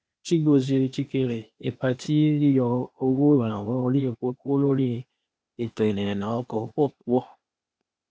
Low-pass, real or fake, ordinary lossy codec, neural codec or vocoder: none; fake; none; codec, 16 kHz, 0.8 kbps, ZipCodec